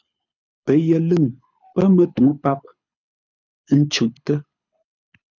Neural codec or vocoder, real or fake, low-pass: codec, 24 kHz, 6 kbps, HILCodec; fake; 7.2 kHz